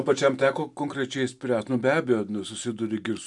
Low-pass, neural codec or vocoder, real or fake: 10.8 kHz; none; real